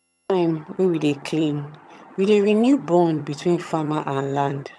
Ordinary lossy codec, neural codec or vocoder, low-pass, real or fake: none; vocoder, 22.05 kHz, 80 mel bands, HiFi-GAN; none; fake